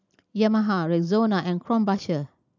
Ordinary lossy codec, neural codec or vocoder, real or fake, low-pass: none; none; real; 7.2 kHz